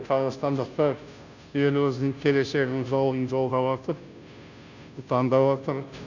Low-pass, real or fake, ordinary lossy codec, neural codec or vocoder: 7.2 kHz; fake; none; codec, 16 kHz, 0.5 kbps, FunCodec, trained on Chinese and English, 25 frames a second